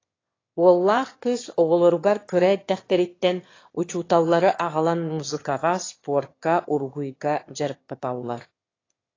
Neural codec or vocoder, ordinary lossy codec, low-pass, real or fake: autoencoder, 22.05 kHz, a latent of 192 numbers a frame, VITS, trained on one speaker; AAC, 32 kbps; 7.2 kHz; fake